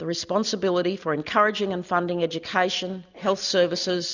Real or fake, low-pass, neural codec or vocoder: real; 7.2 kHz; none